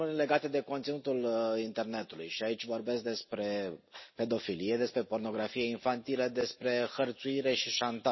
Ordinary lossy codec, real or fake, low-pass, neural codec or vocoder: MP3, 24 kbps; real; 7.2 kHz; none